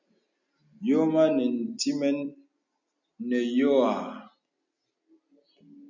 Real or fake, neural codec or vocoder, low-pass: real; none; 7.2 kHz